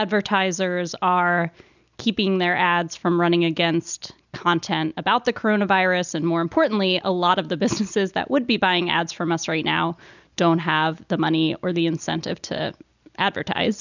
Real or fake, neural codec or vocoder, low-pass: real; none; 7.2 kHz